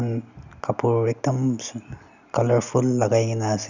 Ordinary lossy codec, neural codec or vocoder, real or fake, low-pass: none; none; real; 7.2 kHz